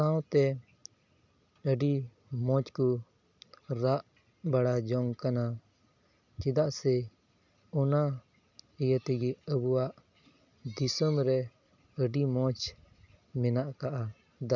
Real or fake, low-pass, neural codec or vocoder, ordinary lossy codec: real; 7.2 kHz; none; none